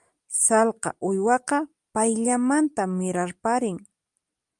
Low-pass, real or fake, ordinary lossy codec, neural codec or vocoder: 10.8 kHz; real; Opus, 32 kbps; none